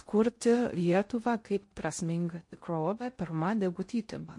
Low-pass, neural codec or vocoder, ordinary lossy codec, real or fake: 10.8 kHz; codec, 16 kHz in and 24 kHz out, 0.6 kbps, FocalCodec, streaming, 2048 codes; MP3, 48 kbps; fake